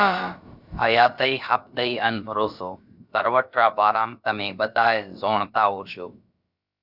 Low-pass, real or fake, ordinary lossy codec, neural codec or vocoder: 5.4 kHz; fake; Opus, 64 kbps; codec, 16 kHz, about 1 kbps, DyCAST, with the encoder's durations